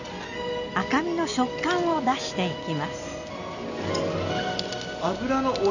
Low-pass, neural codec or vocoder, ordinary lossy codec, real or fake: 7.2 kHz; none; none; real